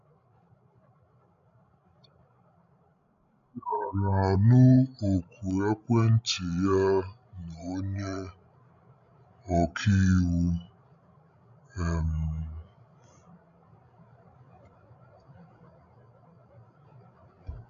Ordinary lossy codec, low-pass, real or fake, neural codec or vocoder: none; 7.2 kHz; fake; codec, 16 kHz, 16 kbps, FreqCodec, larger model